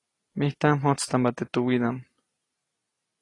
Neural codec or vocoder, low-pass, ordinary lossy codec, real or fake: none; 10.8 kHz; AAC, 32 kbps; real